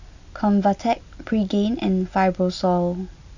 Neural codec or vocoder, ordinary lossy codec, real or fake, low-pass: none; none; real; 7.2 kHz